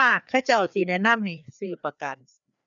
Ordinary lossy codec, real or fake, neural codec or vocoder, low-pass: none; fake; codec, 16 kHz, 2 kbps, FreqCodec, larger model; 7.2 kHz